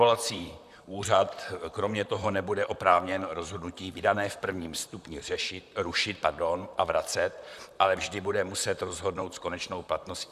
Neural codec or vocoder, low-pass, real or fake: vocoder, 44.1 kHz, 128 mel bands, Pupu-Vocoder; 14.4 kHz; fake